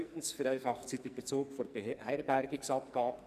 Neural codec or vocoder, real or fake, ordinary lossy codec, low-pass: codec, 44.1 kHz, 2.6 kbps, SNAC; fake; none; 14.4 kHz